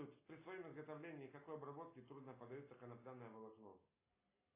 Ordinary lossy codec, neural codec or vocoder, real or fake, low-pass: AAC, 16 kbps; none; real; 3.6 kHz